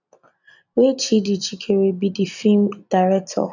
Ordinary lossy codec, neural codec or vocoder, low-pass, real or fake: none; none; 7.2 kHz; real